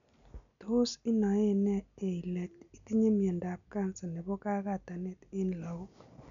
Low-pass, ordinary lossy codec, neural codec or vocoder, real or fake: 7.2 kHz; none; none; real